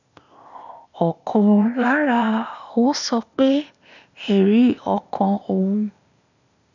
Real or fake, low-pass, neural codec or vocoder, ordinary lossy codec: fake; 7.2 kHz; codec, 16 kHz, 0.8 kbps, ZipCodec; none